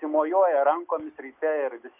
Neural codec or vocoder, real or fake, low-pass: none; real; 3.6 kHz